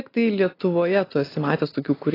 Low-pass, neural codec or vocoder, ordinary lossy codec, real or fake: 5.4 kHz; none; AAC, 24 kbps; real